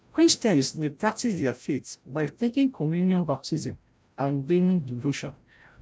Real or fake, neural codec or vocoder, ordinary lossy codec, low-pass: fake; codec, 16 kHz, 0.5 kbps, FreqCodec, larger model; none; none